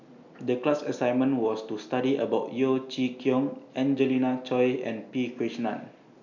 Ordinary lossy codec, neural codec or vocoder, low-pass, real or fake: none; none; 7.2 kHz; real